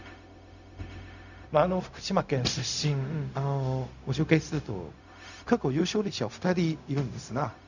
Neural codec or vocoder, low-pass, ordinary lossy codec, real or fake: codec, 16 kHz, 0.4 kbps, LongCat-Audio-Codec; 7.2 kHz; none; fake